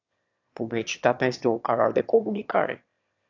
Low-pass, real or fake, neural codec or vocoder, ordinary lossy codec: 7.2 kHz; fake; autoencoder, 22.05 kHz, a latent of 192 numbers a frame, VITS, trained on one speaker; AAC, 48 kbps